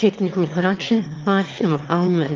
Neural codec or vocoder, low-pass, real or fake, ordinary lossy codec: autoencoder, 22.05 kHz, a latent of 192 numbers a frame, VITS, trained on one speaker; 7.2 kHz; fake; Opus, 32 kbps